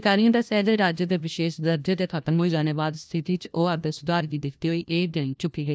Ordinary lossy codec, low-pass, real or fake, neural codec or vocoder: none; none; fake; codec, 16 kHz, 1 kbps, FunCodec, trained on LibriTTS, 50 frames a second